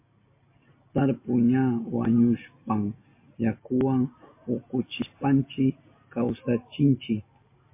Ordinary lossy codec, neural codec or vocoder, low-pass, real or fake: MP3, 24 kbps; none; 3.6 kHz; real